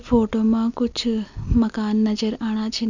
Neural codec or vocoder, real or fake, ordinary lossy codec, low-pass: none; real; none; 7.2 kHz